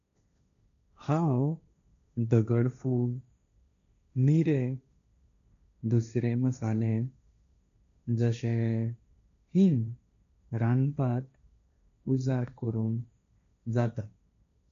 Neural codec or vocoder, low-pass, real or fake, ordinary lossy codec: codec, 16 kHz, 1.1 kbps, Voila-Tokenizer; 7.2 kHz; fake; none